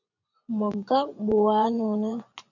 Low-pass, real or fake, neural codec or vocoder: 7.2 kHz; fake; vocoder, 24 kHz, 100 mel bands, Vocos